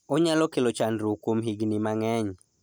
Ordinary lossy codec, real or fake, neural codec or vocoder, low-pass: none; real; none; none